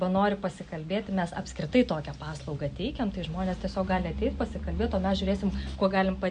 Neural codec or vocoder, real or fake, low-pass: none; real; 9.9 kHz